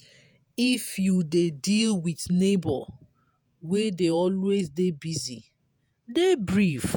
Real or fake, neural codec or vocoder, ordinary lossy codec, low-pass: fake; vocoder, 48 kHz, 128 mel bands, Vocos; none; none